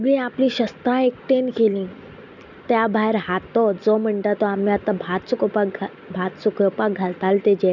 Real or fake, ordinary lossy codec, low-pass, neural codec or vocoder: real; none; 7.2 kHz; none